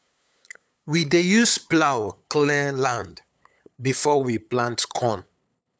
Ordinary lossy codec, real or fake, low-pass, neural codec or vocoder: none; fake; none; codec, 16 kHz, 8 kbps, FunCodec, trained on LibriTTS, 25 frames a second